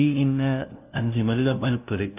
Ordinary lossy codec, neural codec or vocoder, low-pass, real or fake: none; codec, 16 kHz, 0.5 kbps, FunCodec, trained on LibriTTS, 25 frames a second; 3.6 kHz; fake